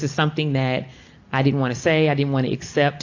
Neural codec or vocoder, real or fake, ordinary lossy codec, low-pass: none; real; AAC, 48 kbps; 7.2 kHz